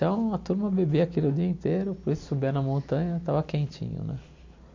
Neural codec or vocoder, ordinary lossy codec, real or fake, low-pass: none; MP3, 48 kbps; real; 7.2 kHz